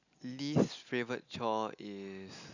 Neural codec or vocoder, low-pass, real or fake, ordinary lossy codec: none; 7.2 kHz; real; none